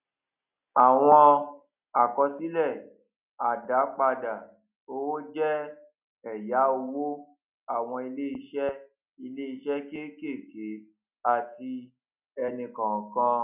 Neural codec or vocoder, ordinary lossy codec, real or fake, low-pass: none; none; real; 3.6 kHz